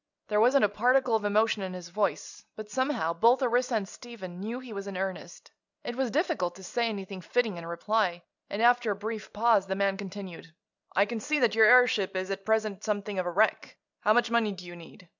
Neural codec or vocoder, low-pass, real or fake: none; 7.2 kHz; real